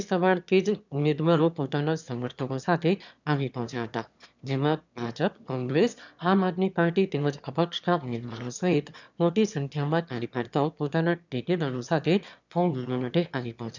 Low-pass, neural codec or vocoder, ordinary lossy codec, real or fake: 7.2 kHz; autoencoder, 22.05 kHz, a latent of 192 numbers a frame, VITS, trained on one speaker; none; fake